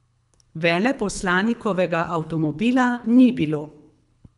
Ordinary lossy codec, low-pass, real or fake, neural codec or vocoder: none; 10.8 kHz; fake; codec, 24 kHz, 3 kbps, HILCodec